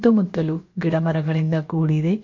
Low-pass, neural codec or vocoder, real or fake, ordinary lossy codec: 7.2 kHz; codec, 16 kHz, about 1 kbps, DyCAST, with the encoder's durations; fake; MP3, 48 kbps